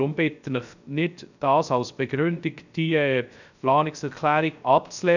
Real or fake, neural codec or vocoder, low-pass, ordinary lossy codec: fake; codec, 16 kHz, 0.3 kbps, FocalCodec; 7.2 kHz; none